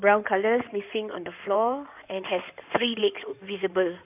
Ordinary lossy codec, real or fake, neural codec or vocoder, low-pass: none; fake; codec, 16 kHz in and 24 kHz out, 2.2 kbps, FireRedTTS-2 codec; 3.6 kHz